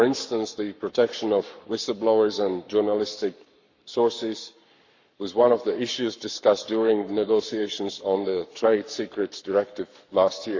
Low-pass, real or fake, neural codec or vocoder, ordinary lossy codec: 7.2 kHz; fake; codec, 44.1 kHz, 7.8 kbps, Pupu-Codec; Opus, 64 kbps